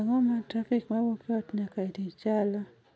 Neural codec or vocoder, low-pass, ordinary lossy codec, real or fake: none; none; none; real